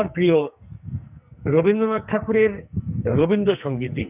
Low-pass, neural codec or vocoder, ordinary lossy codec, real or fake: 3.6 kHz; codec, 44.1 kHz, 2.6 kbps, SNAC; none; fake